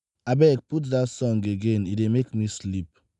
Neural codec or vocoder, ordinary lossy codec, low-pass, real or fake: none; none; 10.8 kHz; real